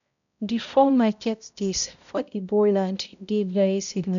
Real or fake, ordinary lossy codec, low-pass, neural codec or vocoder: fake; none; 7.2 kHz; codec, 16 kHz, 0.5 kbps, X-Codec, HuBERT features, trained on balanced general audio